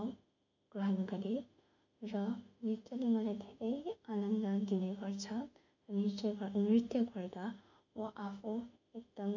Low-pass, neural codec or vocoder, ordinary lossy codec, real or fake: 7.2 kHz; autoencoder, 48 kHz, 32 numbers a frame, DAC-VAE, trained on Japanese speech; none; fake